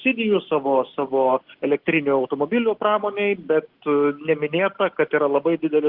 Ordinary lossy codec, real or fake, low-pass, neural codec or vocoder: Opus, 16 kbps; real; 5.4 kHz; none